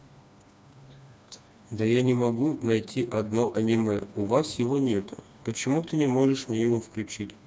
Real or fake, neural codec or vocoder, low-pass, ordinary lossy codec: fake; codec, 16 kHz, 2 kbps, FreqCodec, smaller model; none; none